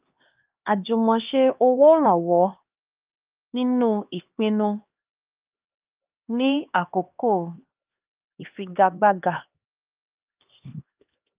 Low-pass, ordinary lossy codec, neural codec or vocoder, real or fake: 3.6 kHz; Opus, 24 kbps; codec, 16 kHz, 2 kbps, X-Codec, HuBERT features, trained on LibriSpeech; fake